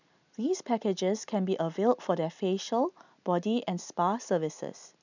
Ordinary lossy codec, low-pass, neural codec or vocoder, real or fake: none; 7.2 kHz; none; real